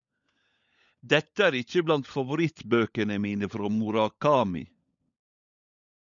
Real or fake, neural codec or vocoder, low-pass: fake; codec, 16 kHz, 16 kbps, FunCodec, trained on LibriTTS, 50 frames a second; 7.2 kHz